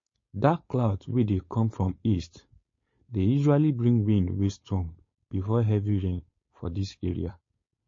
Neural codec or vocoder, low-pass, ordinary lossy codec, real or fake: codec, 16 kHz, 4.8 kbps, FACodec; 7.2 kHz; MP3, 32 kbps; fake